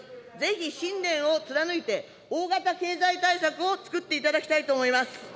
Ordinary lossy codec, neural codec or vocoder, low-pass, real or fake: none; none; none; real